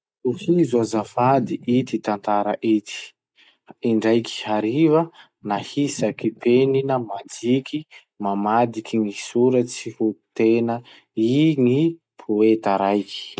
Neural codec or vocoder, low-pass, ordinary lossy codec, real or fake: none; none; none; real